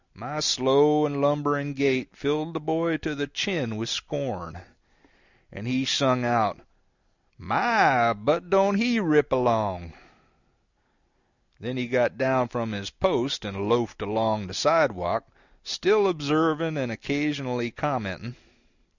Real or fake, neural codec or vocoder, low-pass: real; none; 7.2 kHz